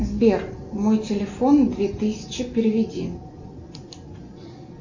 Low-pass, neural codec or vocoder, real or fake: 7.2 kHz; none; real